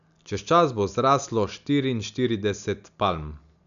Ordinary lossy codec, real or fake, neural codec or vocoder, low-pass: none; real; none; 7.2 kHz